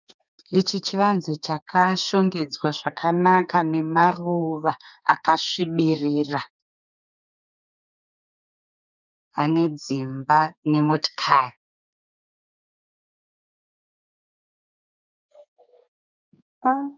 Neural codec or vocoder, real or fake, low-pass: codec, 32 kHz, 1.9 kbps, SNAC; fake; 7.2 kHz